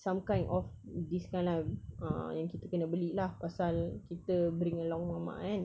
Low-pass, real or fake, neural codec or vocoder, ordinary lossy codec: none; real; none; none